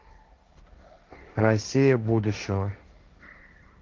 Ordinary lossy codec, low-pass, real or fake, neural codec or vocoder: Opus, 32 kbps; 7.2 kHz; fake; codec, 16 kHz, 1.1 kbps, Voila-Tokenizer